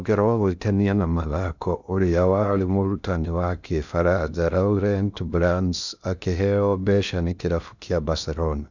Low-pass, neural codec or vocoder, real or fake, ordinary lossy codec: 7.2 kHz; codec, 16 kHz in and 24 kHz out, 0.6 kbps, FocalCodec, streaming, 2048 codes; fake; none